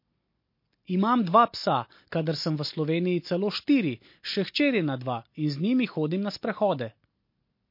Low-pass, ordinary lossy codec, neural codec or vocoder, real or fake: 5.4 kHz; MP3, 32 kbps; none; real